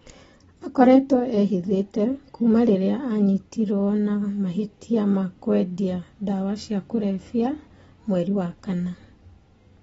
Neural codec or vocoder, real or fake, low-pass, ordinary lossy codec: none; real; 19.8 kHz; AAC, 24 kbps